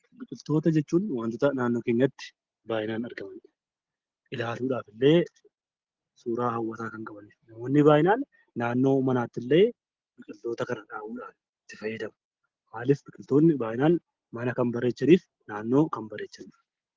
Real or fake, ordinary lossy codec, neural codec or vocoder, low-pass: real; Opus, 16 kbps; none; 7.2 kHz